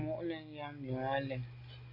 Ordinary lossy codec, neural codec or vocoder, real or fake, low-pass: AAC, 32 kbps; none; real; 5.4 kHz